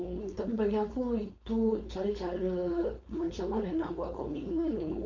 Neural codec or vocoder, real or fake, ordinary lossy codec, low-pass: codec, 16 kHz, 4.8 kbps, FACodec; fake; MP3, 48 kbps; 7.2 kHz